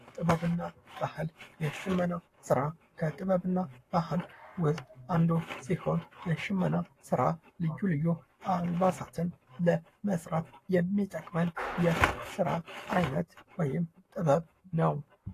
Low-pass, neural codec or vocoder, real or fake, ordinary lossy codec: 14.4 kHz; codec, 44.1 kHz, 7.8 kbps, Pupu-Codec; fake; AAC, 48 kbps